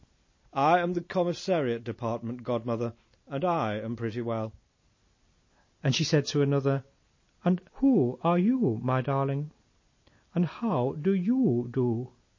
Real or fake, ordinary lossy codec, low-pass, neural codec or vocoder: real; MP3, 32 kbps; 7.2 kHz; none